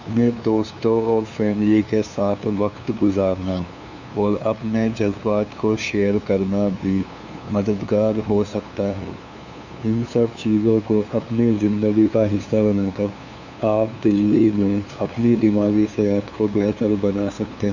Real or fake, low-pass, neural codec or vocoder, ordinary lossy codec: fake; 7.2 kHz; codec, 16 kHz, 2 kbps, FunCodec, trained on LibriTTS, 25 frames a second; none